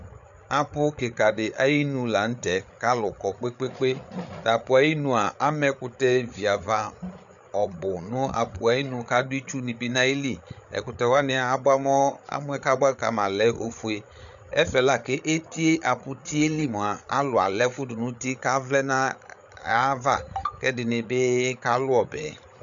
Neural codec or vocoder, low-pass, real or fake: codec, 16 kHz, 8 kbps, FreqCodec, larger model; 7.2 kHz; fake